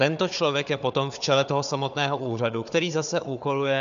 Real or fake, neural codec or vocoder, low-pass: fake; codec, 16 kHz, 4 kbps, FunCodec, trained on Chinese and English, 50 frames a second; 7.2 kHz